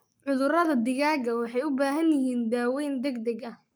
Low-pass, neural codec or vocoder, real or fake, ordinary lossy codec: none; codec, 44.1 kHz, 7.8 kbps, Pupu-Codec; fake; none